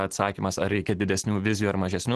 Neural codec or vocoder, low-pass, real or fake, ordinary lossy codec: none; 10.8 kHz; real; Opus, 16 kbps